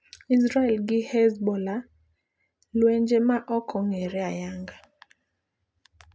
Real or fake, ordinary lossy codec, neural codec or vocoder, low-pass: real; none; none; none